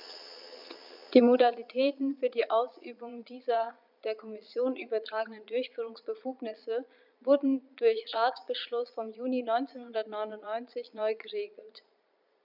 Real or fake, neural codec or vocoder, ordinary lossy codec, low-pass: fake; vocoder, 22.05 kHz, 80 mel bands, Vocos; none; 5.4 kHz